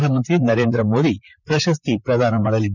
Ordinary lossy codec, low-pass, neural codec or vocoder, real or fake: none; 7.2 kHz; vocoder, 44.1 kHz, 128 mel bands, Pupu-Vocoder; fake